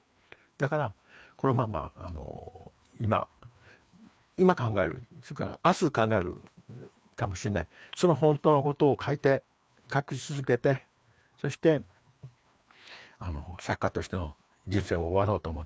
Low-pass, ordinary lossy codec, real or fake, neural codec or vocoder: none; none; fake; codec, 16 kHz, 2 kbps, FreqCodec, larger model